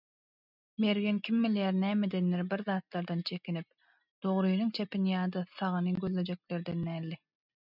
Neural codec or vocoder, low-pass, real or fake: none; 5.4 kHz; real